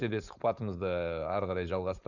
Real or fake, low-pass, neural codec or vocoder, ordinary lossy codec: fake; 7.2 kHz; codec, 16 kHz, 4.8 kbps, FACodec; none